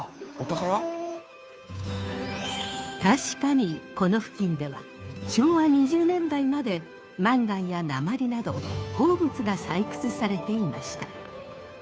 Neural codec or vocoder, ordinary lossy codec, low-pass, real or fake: codec, 16 kHz, 2 kbps, FunCodec, trained on Chinese and English, 25 frames a second; none; none; fake